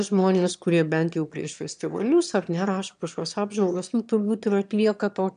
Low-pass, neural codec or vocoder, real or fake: 9.9 kHz; autoencoder, 22.05 kHz, a latent of 192 numbers a frame, VITS, trained on one speaker; fake